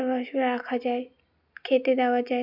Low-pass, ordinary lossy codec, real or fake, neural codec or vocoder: 5.4 kHz; none; real; none